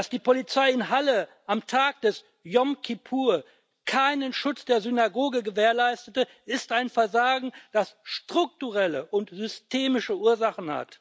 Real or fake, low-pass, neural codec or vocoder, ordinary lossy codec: real; none; none; none